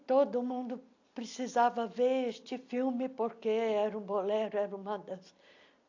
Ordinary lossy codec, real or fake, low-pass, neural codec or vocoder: AAC, 48 kbps; real; 7.2 kHz; none